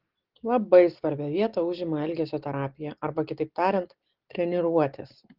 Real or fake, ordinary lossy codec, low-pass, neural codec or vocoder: real; Opus, 16 kbps; 5.4 kHz; none